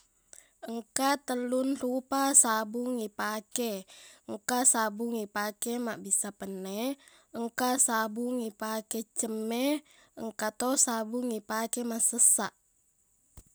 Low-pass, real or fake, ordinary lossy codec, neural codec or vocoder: none; real; none; none